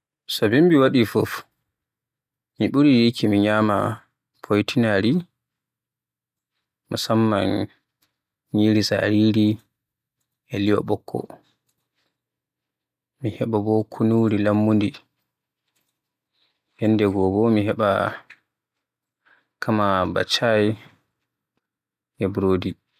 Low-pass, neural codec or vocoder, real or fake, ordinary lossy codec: 14.4 kHz; none; real; none